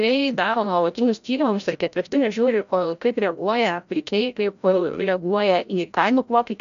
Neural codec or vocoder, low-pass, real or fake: codec, 16 kHz, 0.5 kbps, FreqCodec, larger model; 7.2 kHz; fake